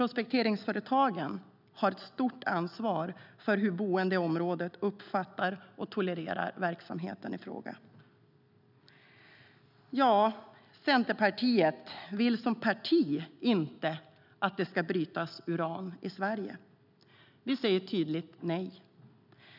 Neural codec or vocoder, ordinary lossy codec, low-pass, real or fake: none; none; 5.4 kHz; real